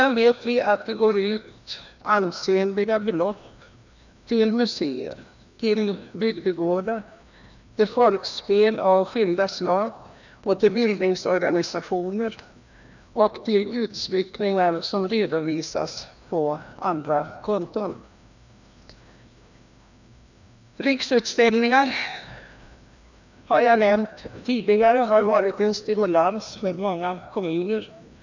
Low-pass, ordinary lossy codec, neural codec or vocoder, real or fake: 7.2 kHz; none; codec, 16 kHz, 1 kbps, FreqCodec, larger model; fake